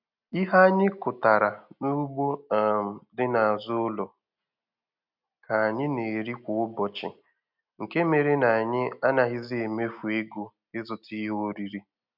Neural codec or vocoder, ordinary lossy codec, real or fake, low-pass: none; none; real; 5.4 kHz